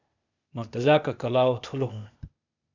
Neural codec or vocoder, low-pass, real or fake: codec, 16 kHz, 0.8 kbps, ZipCodec; 7.2 kHz; fake